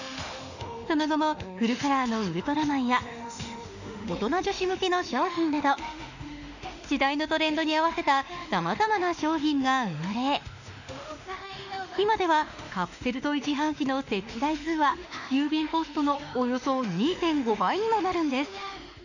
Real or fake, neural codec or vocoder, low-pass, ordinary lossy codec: fake; autoencoder, 48 kHz, 32 numbers a frame, DAC-VAE, trained on Japanese speech; 7.2 kHz; none